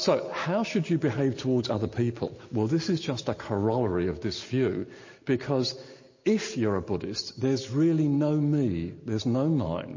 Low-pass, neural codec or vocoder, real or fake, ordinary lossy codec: 7.2 kHz; none; real; MP3, 32 kbps